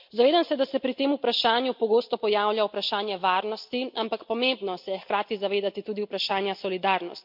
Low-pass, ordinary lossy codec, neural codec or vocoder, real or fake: 5.4 kHz; MP3, 48 kbps; none; real